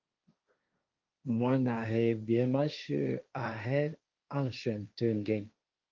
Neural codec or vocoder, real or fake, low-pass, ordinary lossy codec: codec, 16 kHz, 1.1 kbps, Voila-Tokenizer; fake; 7.2 kHz; Opus, 24 kbps